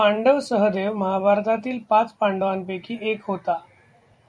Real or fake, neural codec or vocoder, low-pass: fake; vocoder, 44.1 kHz, 128 mel bands every 256 samples, BigVGAN v2; 9.9 kHz